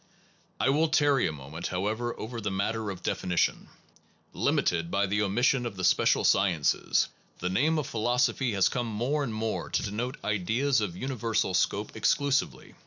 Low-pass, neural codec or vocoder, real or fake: 7.2 kHz; none; real